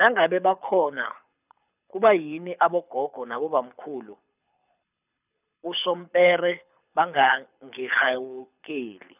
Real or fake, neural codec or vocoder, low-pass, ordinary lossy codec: fake; codec, 24 kHz, 6 kbps, HILCodec; 3.6 kHz; none